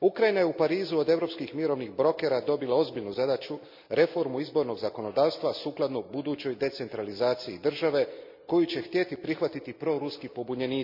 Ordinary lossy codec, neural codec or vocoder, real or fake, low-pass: MP3, 48 kbps; none; real; 5.4 kHz